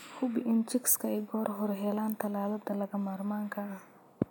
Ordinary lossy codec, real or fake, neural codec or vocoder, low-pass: none; real; none; none